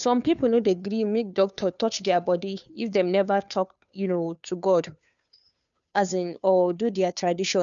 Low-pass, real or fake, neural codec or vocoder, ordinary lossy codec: 7.2 kHz; fake; codec, 16 kHz, 2 kbps, FunCodec, trained on Chinese and English, 25 frames a second; none